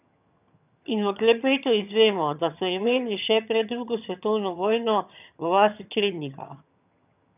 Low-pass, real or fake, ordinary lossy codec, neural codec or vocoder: 3.6 kHz; fake; none; vocoder, 22.05 kHz, 80 mel bands, HiFi-GAN